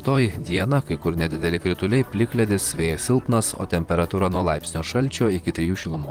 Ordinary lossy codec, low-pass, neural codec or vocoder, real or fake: Opus, 24 kbps; 19.8 kHz; vocoder, 44.1 kHz, 128 mel bands, Pupu-Vocoder; fake